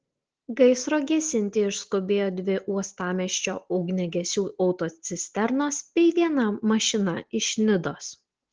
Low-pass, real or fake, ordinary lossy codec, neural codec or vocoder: 7.2 kHz; real; Opus, 16 kbps; none